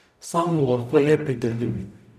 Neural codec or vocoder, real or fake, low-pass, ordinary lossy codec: codec, 44.1 kHz, 0.9 kbps, DAC; fake; 14.4 kHz; none